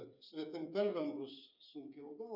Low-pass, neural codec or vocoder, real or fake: 5.4 kHz; codec, 16 kHz, 8 kbps, FreqCodec, smaller model; fake